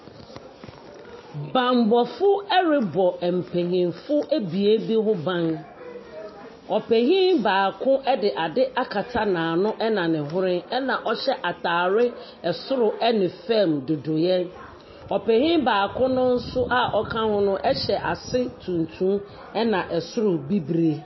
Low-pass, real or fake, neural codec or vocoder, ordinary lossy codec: 7.2 kHz; real; none; MP3, 24 kbps